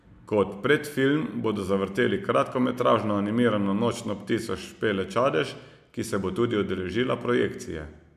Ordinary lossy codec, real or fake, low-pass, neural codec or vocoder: MP3, 96 kbps; real; 14.4 kHz; none